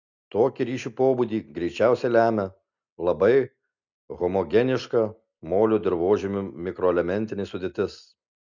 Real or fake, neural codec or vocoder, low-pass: real; none; 7.2 kHz